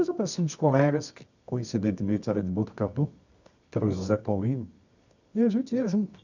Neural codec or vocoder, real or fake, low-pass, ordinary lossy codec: codec, 24 kHz, 0.9 kbps, WavTokenizer, medium music audio release; fake; 7.2 kHz; none